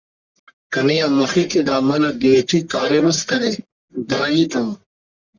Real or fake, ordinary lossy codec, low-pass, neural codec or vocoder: fake; Opus, 64 kbps; 7.2 kHz; codec, 44.1 kHz, 1.7 kbps, Pupu-Codec